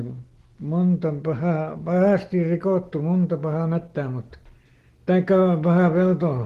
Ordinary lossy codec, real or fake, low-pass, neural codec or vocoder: Opus, 16 kbps; real; 14.4 kHz; none